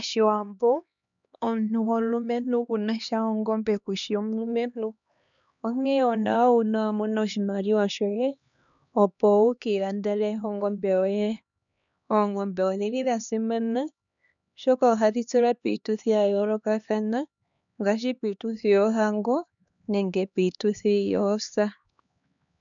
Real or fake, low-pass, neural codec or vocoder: fake; 7.2 kHz; codec, 16 kHz, 2 kbps, X-Codec, HuBERT features, trained on LibriSpeech